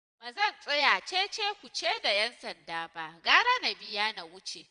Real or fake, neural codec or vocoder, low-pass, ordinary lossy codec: fake; vocoder, 22.05 kHz, 80 mel bands, Vocos; 9.9 kHz; none